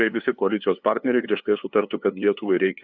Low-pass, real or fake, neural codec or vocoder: 7.2 kHz; fake; codec, 16 kHz, 2 kbps, FunCodec, trained on LibriTTS, 25 frames a second